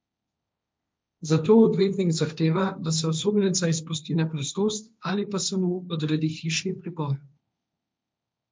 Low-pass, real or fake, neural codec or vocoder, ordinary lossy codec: none; fake; codec, 16 kHz, 1.1 kbps, Voila-Tokenizer; none